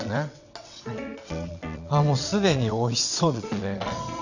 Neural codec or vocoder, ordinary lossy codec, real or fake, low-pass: vocoder, 22.05 kHz, 80 mel bands, WaveNeXt; none; fake; 7.2 kHz